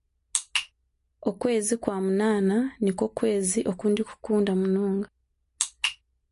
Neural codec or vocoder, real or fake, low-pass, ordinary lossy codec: none; real; 14.4 kHz; MP3, 48 kbps